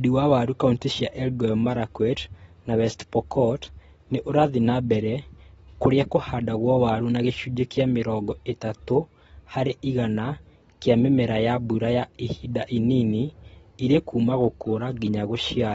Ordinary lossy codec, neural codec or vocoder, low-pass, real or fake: AAC, 24 kbps; none; 19.8 kHz; real